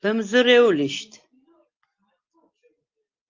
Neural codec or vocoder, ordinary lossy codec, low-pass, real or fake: none; Opus, 24 kbps; 7.2 kHz; real